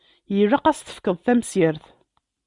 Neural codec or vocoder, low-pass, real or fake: none; 10.8 kHz; real